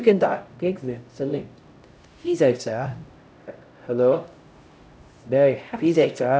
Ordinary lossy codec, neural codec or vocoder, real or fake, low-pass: none; codec, 16 kHz, 0.5 kbps, X-Codec, HuBERT features, trained on LibriSpeech; fake; none